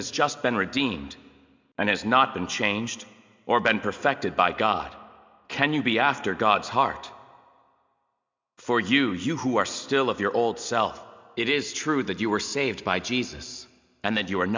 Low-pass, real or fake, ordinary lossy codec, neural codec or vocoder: 7.2 kHz; real; MP3, 64 kbps; none